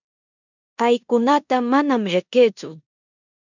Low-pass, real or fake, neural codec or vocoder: 7.2 kHz; fake; codec, 16 kHz in and 24 kHz out, 0.9 kbps, LongCat-Audio-Codec, four codebook decoder